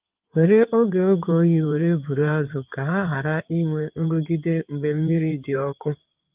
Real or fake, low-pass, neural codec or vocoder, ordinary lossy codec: fake; 3.6 kHz; codec, 16 kHz in and 24 kHz out, 2.2 kbps, FireRedTTS-2 codec; Opus, 32 kbps